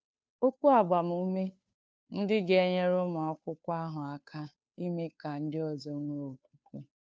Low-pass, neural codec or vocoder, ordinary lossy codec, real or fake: none; codec, 16 kHz, 2 kbps, FunCodec, trained on Chinese and English, 25 frames a second; none; fake